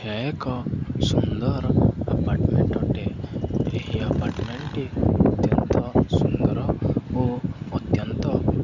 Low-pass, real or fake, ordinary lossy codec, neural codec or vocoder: 7.2 kHz; real; none; none